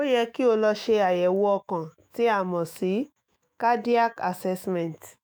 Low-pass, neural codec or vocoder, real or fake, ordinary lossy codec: none; autoencoder, 48 kHz, 128 numbers a frame, DAC-VAE, trained on Japanese speech; fake; none